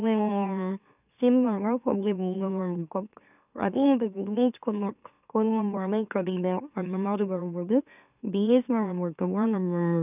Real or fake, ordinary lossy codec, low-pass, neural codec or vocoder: fake; none; 3.6 kHz; autoencoder, 44.1 kHz, a latent of 192 numbers a frame, MeloTTS